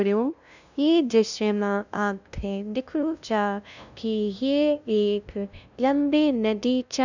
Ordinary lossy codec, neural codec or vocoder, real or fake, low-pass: none; codec, 16 kHz, 0.5 kbps, FunCodec, trained on LibriTTS, 25 frames a second; fake; 7.2 kHz